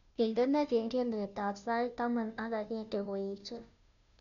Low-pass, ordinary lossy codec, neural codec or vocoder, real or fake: 7.2 kHz; none; codec, 16 kHz, 0.5 kbps, FunCodec, trained on Chinese and English, 25 frames a second; fake